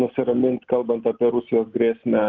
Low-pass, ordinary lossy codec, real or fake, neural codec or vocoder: 7.2 kHz; Opus, 24 kbps; real; none